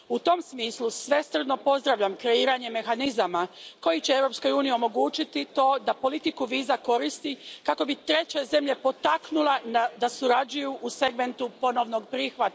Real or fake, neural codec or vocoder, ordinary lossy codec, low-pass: real; none; none; none